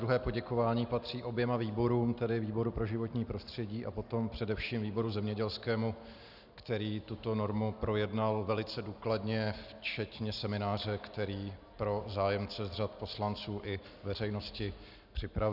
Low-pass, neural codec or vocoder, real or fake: 5.4 kHz; none; real